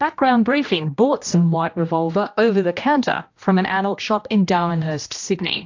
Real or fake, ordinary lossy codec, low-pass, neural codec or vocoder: fake; AAC, 48 kbps; 7.2 kHz; codec, 16 kHz, 1 kbps, X-Codec, HuBERT features, trained on general audio